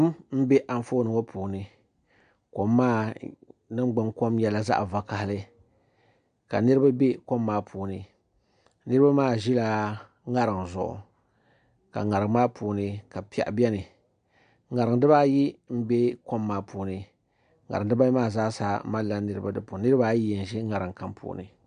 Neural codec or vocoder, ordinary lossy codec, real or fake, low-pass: none; AAC, 96 kbps; real; 9.9 kHz